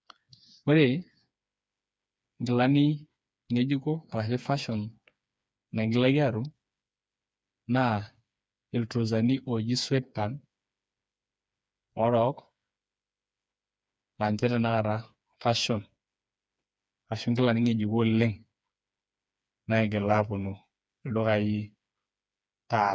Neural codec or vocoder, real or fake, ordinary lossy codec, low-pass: codec, 16 kHz, 4 kbps, FreqCodec, smaller model; fake; none; none